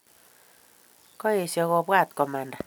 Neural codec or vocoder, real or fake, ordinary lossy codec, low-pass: none; real; none; none